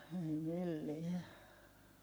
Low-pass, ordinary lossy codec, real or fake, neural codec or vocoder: none; none; fake; codec, 44.1 kHz, 7.8 kbps, Pupu-Codec